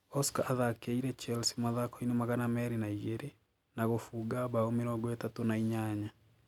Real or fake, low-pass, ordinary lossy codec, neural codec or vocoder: real; 19.8 kHz; none; none